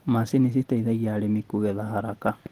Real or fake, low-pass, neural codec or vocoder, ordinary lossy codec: real; 14.4 kHz; none; Opus, 16 kbps